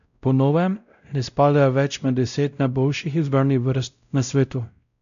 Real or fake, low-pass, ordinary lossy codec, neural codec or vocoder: fake; 7.2 kHz; none; codec, 16 kHz, 0.5 kbps, X-Codec, WavLM features, trained on Multilingual LibriSpeech